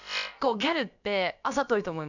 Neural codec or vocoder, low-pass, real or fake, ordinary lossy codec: codec, 16 kHz, about 1 kbps, DyCAST, with the encoder's durations; 7.2 kHz; fake; none